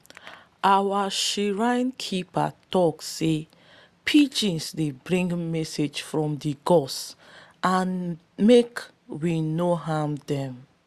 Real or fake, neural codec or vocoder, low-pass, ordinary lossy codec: real; none; 14.4 kHz; Opus, 64 kbps